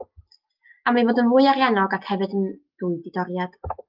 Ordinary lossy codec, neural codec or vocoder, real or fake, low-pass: Opus, 32 kbps; none; real; 5.4 kHz